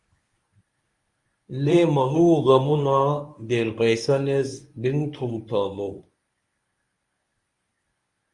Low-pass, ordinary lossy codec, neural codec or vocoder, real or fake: 10.8 kHz; Opus, 64 kbps; codec, 24 kHz, 0.9 kbps, WavTokenizer, medium speech release version 1; fake